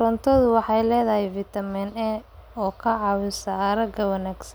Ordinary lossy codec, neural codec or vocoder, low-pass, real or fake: none; none; none; real